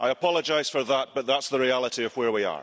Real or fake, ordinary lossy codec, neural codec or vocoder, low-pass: real; none; none; none